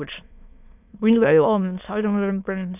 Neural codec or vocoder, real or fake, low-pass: autoencoder, 22.05 kHz, a latent of 192 numbers a frame, VITS, trained on many speakers; fake; 3.6 kHz